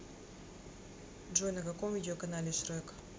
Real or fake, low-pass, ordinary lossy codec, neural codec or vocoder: real; none; none; none